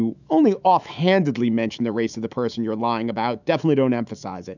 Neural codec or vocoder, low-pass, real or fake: autoencoder, 48 kHz, 128 numbers a frame, DAC-VAE, trained on Japanese speech; 7.2 kHz; fake